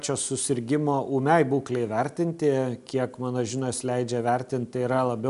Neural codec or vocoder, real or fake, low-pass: none; real; 10.8 kHz